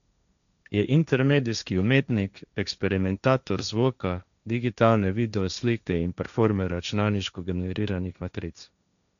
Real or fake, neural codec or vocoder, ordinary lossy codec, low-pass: fake; codec, 16 kHz, 1.1 kbps, Voila-Tokenizer; none; 7.2 kHz